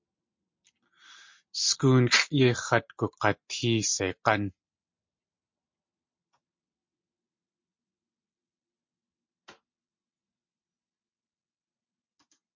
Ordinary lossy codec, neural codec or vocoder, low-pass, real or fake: MP3, 48 kbps; none; 7.2 kHz; real